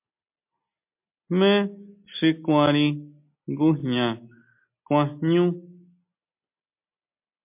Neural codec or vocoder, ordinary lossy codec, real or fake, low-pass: none; MP3, 32 kbps; real; 3.6 kHz